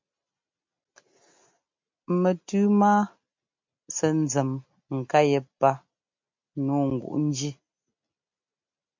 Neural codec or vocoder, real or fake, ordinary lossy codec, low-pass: none; real; MP3, 48 kbps; 7.2 kHz